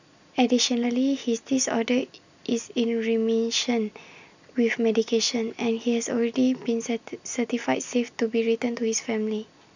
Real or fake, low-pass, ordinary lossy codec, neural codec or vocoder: real; 7.2 kHz; none; none